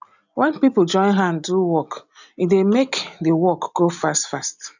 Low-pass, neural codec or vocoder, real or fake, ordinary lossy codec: 7.2 kHz; none; real; none